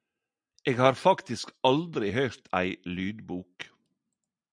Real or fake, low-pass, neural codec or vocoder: real; 9.9 kHz; none